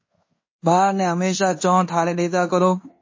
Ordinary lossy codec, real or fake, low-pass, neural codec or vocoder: MP3, 32 kbps; fake; 7.2 kHz; codec, 16 kHz in and 24 kHz out, 0.9 kbps, LongCat-Audio-Codec, fine tuned four codebook decoder